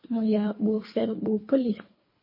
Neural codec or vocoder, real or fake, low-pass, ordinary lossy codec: codec, 24 kHz, 3 kbps, HILCodec; fake; 5.4 kHz; MP3, 24 kbps